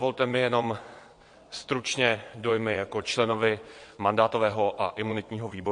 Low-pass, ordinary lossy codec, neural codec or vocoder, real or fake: 9.9 kHz; MP3, 48 kbps; vocoder, 22.05 kHz, 80 mel bands, WaveNeXt; fake